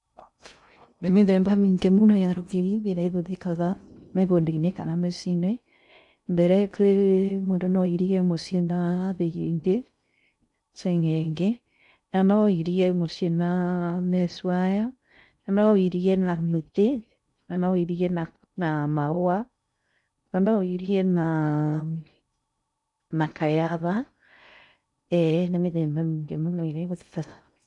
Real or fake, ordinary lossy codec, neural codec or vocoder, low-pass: fake; none; codec, 16 kHz in and 24 kHz out, 0.6 kbps, FocalCodec, streaming, 2048 codes; 10.8 kHz